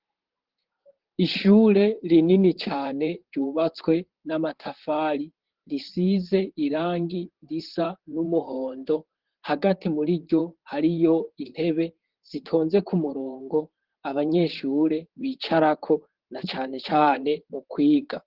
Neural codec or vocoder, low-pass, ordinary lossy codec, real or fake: vocoder, 44.1 kHz, 128 mel bands, Pupu-Vocoder; 5.4 kHz; Opus, 16 kbps; fake